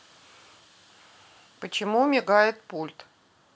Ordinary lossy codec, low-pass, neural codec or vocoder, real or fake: none; none; none; real